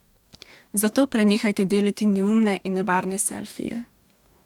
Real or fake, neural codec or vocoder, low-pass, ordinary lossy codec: fake; codec, 44.1 kHz, 2.6 kbps, DAC; 19.8 kHz; none